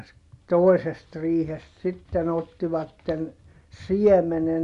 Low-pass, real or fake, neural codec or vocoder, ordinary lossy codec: 10.8 kHz; real; none; none